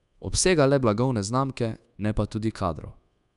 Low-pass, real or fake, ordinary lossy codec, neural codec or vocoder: 10.8 kHz; fake; none; codec, 24 kHz, 1.2 kbps, DualCodec